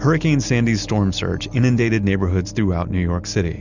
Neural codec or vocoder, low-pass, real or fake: none; 7.2 kHz; real